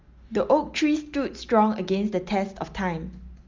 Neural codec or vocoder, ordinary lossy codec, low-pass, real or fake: none; Opus, 32 kbps; 7.2 kHz; real